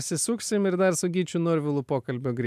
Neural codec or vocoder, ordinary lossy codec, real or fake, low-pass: none; AAC, 96 kbps; real; 14.4 kHz